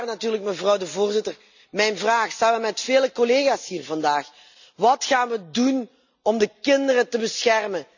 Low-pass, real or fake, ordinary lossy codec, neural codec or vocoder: 7.2 kHz; real; none; none